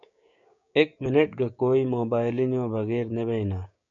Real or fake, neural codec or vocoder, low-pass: fake; codec, 16 kHz, 6 kbps, DAC; 7.2 kHz